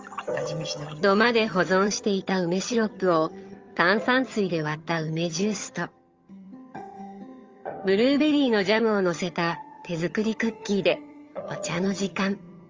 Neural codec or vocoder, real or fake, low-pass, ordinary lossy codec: vocoder, 22.05 kHz, 80 mel bands, HiFi-GAN; fake; 7.2 kHz; Opus, 32 kbps